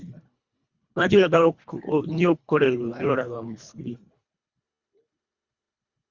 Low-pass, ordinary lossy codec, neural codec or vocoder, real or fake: 7.2 kHz; Opus, 64 kbps; codec, 24 kHz, 1.5 kbps, HILCodec; fake